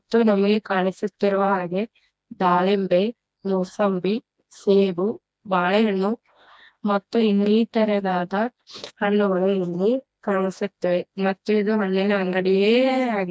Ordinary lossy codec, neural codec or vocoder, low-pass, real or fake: none; codec, 16 kHz, 1 kbps, FreqCodec, smaller model; none; fake